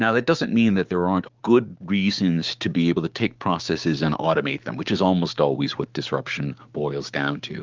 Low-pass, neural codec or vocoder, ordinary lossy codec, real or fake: 7.2 kHz; codec, 16 kHz, 6 kbps, DAC; Opus, 32 kbps; fake